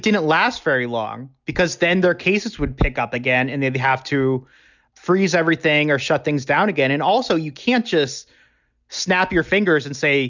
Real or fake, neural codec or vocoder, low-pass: real; none; 7.2 kHz